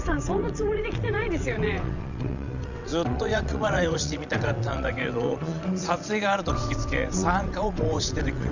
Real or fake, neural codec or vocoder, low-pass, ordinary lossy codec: fake; vocoder, 22.05 kHz, 80 mel bands, WaveNeXt; 7.2 kHz; none